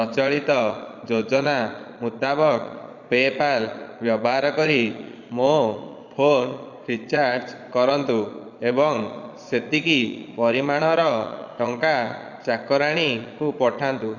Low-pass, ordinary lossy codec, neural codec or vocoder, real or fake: 7.2 kHz; Opus, 64 kbps; vocoder, 22.05 kHz, 80 mel bands, Vocos; fake